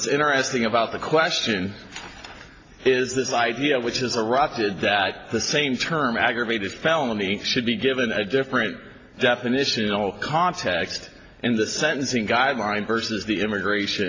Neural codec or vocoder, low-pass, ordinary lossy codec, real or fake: none; 7.2 kHz; AAC, 32 kbps; real